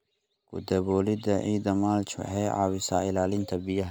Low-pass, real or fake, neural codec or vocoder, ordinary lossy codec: none; real; none; none